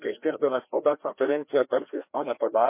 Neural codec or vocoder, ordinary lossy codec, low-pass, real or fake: codec, 16 kHz, 1 kbps, FreqCodec, larger model; MP3, 24 kbps; 3.6 kHz; fake